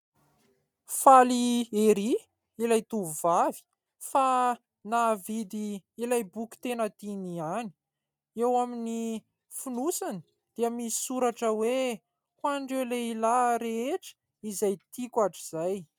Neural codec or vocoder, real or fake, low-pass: none; real; 19.8 kHz